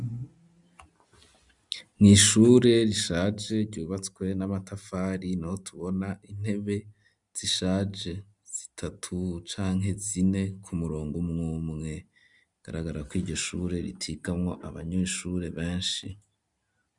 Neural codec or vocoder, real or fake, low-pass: none; real; 10.8 kHz